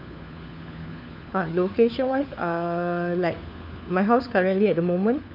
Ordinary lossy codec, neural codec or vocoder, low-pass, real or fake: none; codec, 16 kHz, 4 kbps, FunCodec, trained on LibriTTS, 50 frames a second; 5.4 kHz; fake